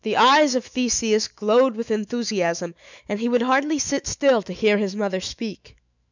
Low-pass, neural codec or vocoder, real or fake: 7.2 kHz; autoencoder, 48 kHz, 128 numbers a frame, DAC-VAE, trained on Japanese speech; fake